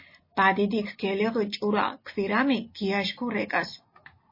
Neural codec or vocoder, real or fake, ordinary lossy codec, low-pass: none; real; MP3, 24 kbps; 5.4 kHz